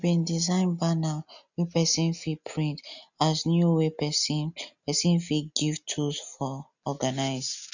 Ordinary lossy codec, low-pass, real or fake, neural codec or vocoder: none; 7.2 kHz; real; none